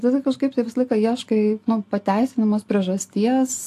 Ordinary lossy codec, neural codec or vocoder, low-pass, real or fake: AAC, 64 kbps; none; 14.4 kHz; real